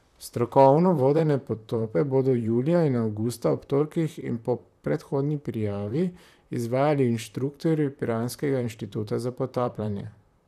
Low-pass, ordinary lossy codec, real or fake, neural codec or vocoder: 14.4 kHz; none; fake; vocoder, 44.1 kHz, 128 mel bands, Pupu-Vocoder